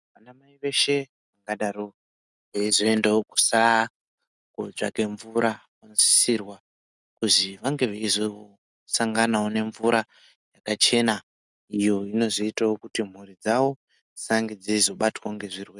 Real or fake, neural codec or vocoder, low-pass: real; none; 10.8 kHz